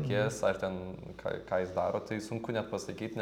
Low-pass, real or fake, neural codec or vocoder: 19.8 kHz; real; none